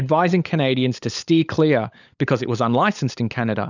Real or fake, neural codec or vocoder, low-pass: fake; codec, 16 kHz, 16 kbps, FunCodec, trained on LibriTTS, 50 frames a second; 7.2 kHz